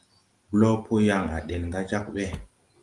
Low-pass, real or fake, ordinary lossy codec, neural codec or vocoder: 10.8 kHz; real; Opus, 24 kbps; none